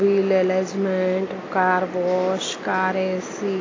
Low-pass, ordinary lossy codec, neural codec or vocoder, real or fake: 7.2 kHz; AAC, 32 kbps; none; real